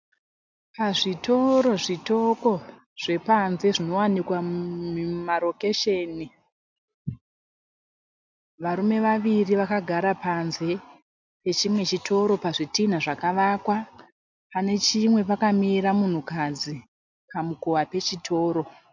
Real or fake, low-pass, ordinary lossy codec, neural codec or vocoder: real; 7.2 kHz; MP3, 64 kbps; none